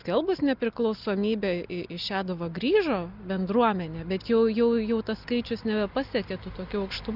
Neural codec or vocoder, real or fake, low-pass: none; real; 5.4 kHz